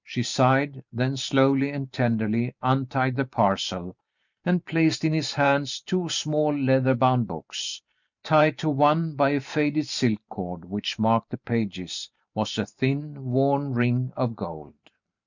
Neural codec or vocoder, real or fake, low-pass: vocoder, 44.1 kHz, 128 mel bands every 512 samples, BigVGAN v2; fake; 7.2 kHz